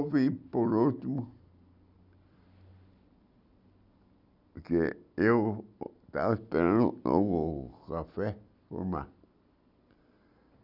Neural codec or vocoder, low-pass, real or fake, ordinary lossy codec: none; 5.4 kHz; real; none